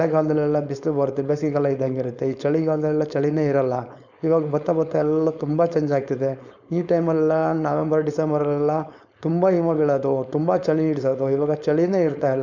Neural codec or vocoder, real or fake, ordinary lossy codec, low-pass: codec, 16 kHz, 4.8 kbps, FACodec; fake; none; 7.2 kHz